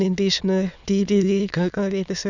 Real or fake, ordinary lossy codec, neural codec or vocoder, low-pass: fake; none; autoencoder, 22.05 kHz, a latent of 192 numbers a frame, VITS, trained on many speakers; 7.2 kHz